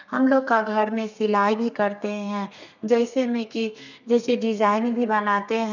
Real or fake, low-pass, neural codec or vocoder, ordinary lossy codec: fake; 7.2 kHz; codec, 32 kHz, 1.9 kbps, SNAC; none